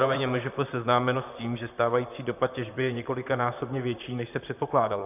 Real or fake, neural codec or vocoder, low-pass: fake; vocoder, 44.1 kHz, 128 mel bands, Pupu-Vocoder; 3.6 kHz